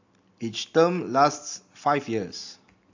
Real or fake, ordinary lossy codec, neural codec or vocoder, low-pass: real; AAC, 48 kbps; none; 7.2 kHz